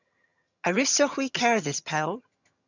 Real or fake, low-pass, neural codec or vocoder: fake; 7.2 kHz; vocoder, 22.05 kHz, 80 mel bands, HiFi-GAN